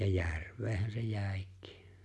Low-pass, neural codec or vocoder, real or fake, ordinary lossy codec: none; none; real; none